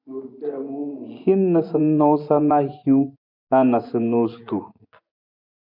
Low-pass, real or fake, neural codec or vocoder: 5.4 kHz; fake; vocoder, 24 kHz, 100 mel bands, Vocos